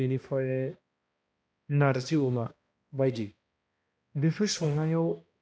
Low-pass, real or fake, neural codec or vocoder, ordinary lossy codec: none; fake; codec, 16 kHz, 1 kbps, X-Codec, HuBERT features, trained on balanced general audio; none